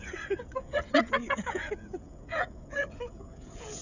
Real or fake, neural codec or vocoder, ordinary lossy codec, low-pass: fake; codec, 16 kHz, 16 kbps, FreqCodec, smaller model; none; 7.2 kHz